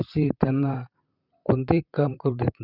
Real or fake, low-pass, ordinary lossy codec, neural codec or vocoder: fake; 5.4 kHz; none; vocoder, 44.1 kHz, 128 mel bands, Pupu-Vocoder